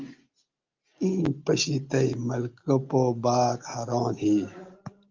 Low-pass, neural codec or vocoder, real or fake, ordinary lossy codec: 7.2 kHz; none; real; Opus, 24 kbps